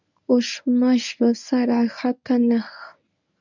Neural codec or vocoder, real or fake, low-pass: codec, 24 kHz, 0.9 kbps, WavTokenizer, medium speech release version 1; fake; 7.2 kHz